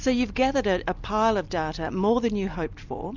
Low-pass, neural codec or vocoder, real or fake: 7.2 kHz; none; real